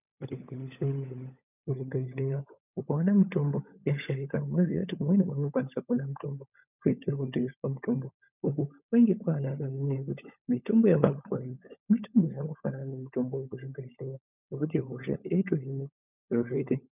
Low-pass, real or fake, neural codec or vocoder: 3.6 kHz; fake; codec, 16 kHz, 16 kbps, FunCodec, trained on LibriTTS, 50 frames a second